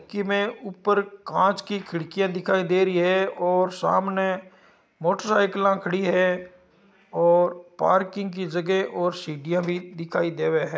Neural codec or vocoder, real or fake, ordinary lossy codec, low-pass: none; real; none; none